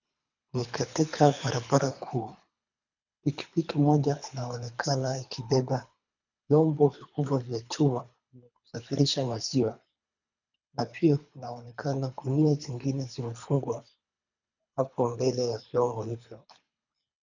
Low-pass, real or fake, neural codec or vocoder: 7.2 kHz; fake; codec, 24 kHz, 3 kbps, HILCodec